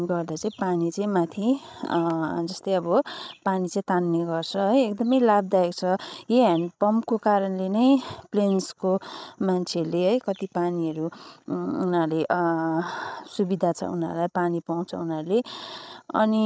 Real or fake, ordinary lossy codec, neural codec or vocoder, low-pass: fake; none; codec, 16 kHz, 16 kbps, FreqCodec, larger model; none